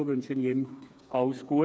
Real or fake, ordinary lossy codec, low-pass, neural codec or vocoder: fake; none; none; codec, 16 kHz, 4 kbps, FreqCodec, smaller model